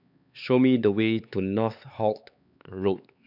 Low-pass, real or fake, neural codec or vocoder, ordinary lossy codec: 5.4 kHz; fake; codec, 16 kHz, 4 kbps, X-Codec, HuBERT features, trained on LibriSpeech; none